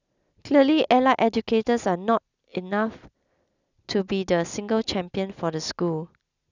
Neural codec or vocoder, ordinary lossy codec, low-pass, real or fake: none; none; 7.2 kHz; real